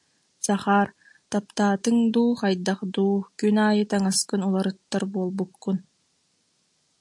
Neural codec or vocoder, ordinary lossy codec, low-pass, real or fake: none; AAC, 64 kbps; 10.8 kHz; real